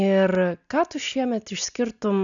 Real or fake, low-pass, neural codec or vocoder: real; 7.2 kHz; none